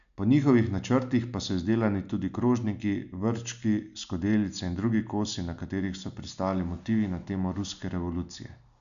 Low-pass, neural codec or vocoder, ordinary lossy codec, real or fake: 7.2 kHz; none; none; real